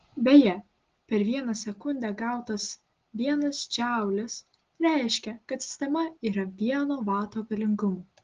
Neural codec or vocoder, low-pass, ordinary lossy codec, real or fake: none; 7.2 kHz; Opus, 16 kbps; real